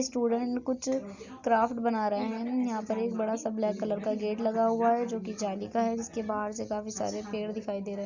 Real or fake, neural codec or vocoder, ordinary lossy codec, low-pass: real; none; Opus, 64 kbps; 7.2 kHz